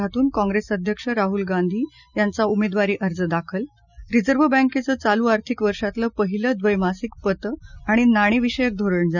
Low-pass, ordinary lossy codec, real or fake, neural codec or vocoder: 7.2 kHz; none; real; none